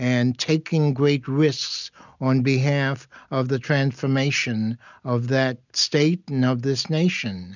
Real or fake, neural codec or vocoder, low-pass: real; none; 7.2 kHz